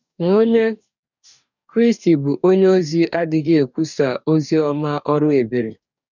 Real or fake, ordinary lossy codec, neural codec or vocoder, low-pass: fake; none; codec, 44.1 kHz, 2.6 kbps, DAC; 7.2 kHz